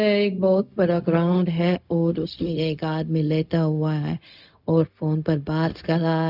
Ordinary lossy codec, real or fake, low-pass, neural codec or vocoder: none; fake; 5.4 kHz; codec, 16 kHz, 0.4 kbps, LongCat-Audio-Codec